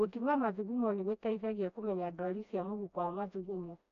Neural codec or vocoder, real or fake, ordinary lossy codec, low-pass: codec, 16 kHz, 1 kbps, FreqCodec, smaller model; fake; none; 7.2 kHz